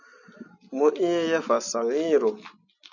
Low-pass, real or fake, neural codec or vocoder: 7.2 kHz; real; none